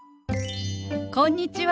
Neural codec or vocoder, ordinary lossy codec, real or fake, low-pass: none; none; real; none